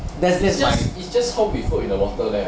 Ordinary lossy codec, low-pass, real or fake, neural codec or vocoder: none; none; real; none